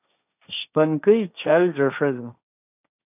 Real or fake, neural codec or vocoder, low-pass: fake; codec, 16 kHz, 1.1 kbps, Voila-Tokenizer; 3.6 kHz